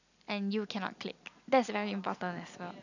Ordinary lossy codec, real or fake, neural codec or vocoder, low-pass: none; real; none; 7.2 kHz